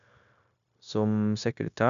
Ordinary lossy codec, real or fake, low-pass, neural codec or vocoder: none; fake; 7.2 kHz; codec, 16 kHz, 0.9 kbps, LongCat-Audio-Codec